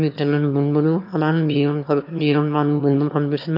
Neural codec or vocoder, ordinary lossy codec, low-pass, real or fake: autoencoder, 22.05 kHz, a latent of 192 numbers a frame, VITS, trained on one speaker; none; 5.4 kHz; fake